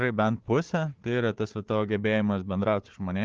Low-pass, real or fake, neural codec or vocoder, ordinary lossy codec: 7.2 kHz; fake; codec, 16 kHz, 4 kbps, FunCodec, trained on Chinese and English, 50 frames a second; Opus, 16 kbps